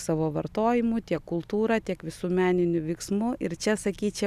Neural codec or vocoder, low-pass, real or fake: none; 14.4 kHz; real